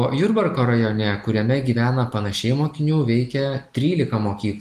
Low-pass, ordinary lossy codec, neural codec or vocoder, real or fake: 14.4 kHz; Opus, 24 kbps; none; real